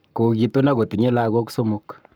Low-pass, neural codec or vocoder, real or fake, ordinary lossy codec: none; codec, 44.1 kHz, 7.8 kbps, Pupu-Codec; fake; none